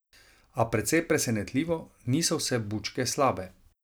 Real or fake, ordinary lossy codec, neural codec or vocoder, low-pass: real; none; none; none